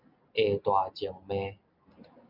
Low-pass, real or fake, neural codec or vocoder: 5.4 kHz; real; none